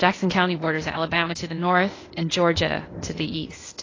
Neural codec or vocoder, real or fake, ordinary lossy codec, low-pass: codec, 16 kHz, 0.8 kbps, ZipCodec; fake; AAC, 32 kbps; 7.2 kHz